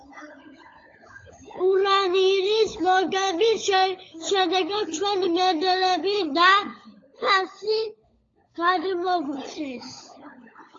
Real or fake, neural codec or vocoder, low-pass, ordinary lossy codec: fake; codec, 16 kHz, 16 kbps, FunCodec, trained on LibriTTS, 50 frames a second; 7.2 kHz; AAC, 32 kbps